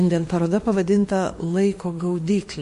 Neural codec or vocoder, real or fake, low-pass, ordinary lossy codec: autoencoder, 48 kHz, 32 numbers a frame, DAC-VAE, trained on Japanese speech; fake; 14.4 kHz; MP3, 48 kbps